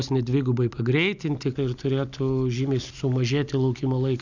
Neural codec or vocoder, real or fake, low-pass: none; real; 7.2 kHz